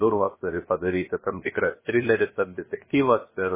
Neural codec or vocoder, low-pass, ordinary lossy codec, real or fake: codec, 16 kHz, 0.7 kbps, FocalCodec; 3.6 kHz; MP3, 16 kbps; fake